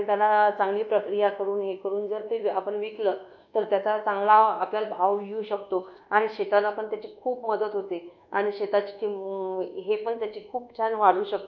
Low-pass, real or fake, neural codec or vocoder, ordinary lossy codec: 7.2 kHz; fake; codec, 24 kHz, 1.2 kbps, DualCodec; none